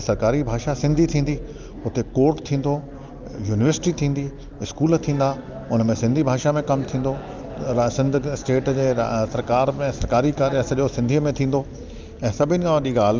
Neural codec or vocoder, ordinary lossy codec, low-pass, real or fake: none; Opus, 24 kbps; 7.2 kHz; real